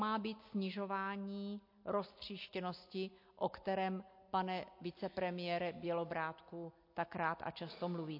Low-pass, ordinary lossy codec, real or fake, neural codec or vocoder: 5.4 kHz; MP3, 32 kbps; real; none